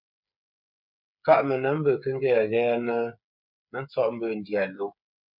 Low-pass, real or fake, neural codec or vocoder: 5.4 kHz; fake; codec, 16 kHz, 8 kbps, FreqCodec, smaller model